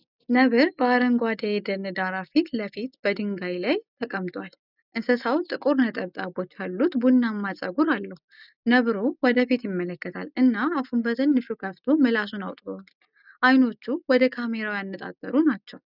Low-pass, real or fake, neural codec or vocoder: 5.4 kHz; real; none